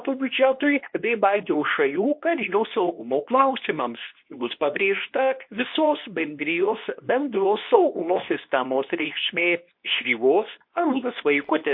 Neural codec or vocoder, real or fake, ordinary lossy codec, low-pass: codec, 24 kHz, 0.9 kbps, WavTokenizer, medium speech release version 2; fake; MP3, 32 kbps; 5.4 kHz